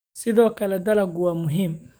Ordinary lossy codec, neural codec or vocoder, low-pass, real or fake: none; codec, 44.1 kHz, 7.8 kbps, DAC; none; fake